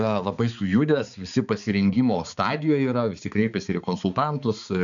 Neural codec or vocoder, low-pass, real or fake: codec, 16 kHz, 4 kbps, X-Codec, HuBERT features, trained on balanced general audio; 7.2 kHz; fake